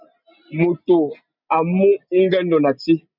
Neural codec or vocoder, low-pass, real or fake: none; 5.4 kHz; real